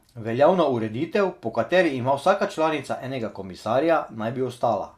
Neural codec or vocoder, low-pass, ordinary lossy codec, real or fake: none; 14.4 kHz; Opus, 64 kbps; real